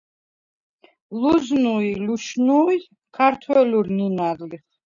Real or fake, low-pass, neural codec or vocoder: real; 7.2 kHz; none